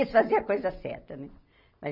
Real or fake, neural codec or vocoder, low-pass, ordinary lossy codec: real; none; 5.4 kHz; none